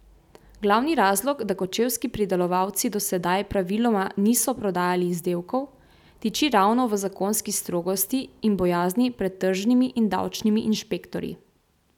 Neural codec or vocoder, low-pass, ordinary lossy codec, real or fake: none; 19.8 kHz; none; real